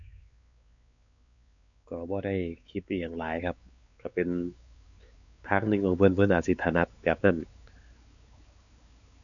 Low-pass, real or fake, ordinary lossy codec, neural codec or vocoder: 7.2 kHz; fake; none; codec, 16 kHz, 4 kbps, X-Codec, WavLM features, trained on Multilingual LibriSpeech